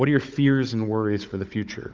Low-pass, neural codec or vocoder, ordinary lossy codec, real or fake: 7.2 kHz; codec, 16 kHz, 16 kbps, FunCodec, trained on Chinese and English, 50 frames a second; Opus, 24 kbps; fake